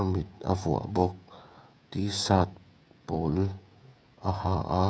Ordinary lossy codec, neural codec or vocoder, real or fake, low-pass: none; codec, 16 kHz, 16 kbps, FreqCodec, smaller model; fake; none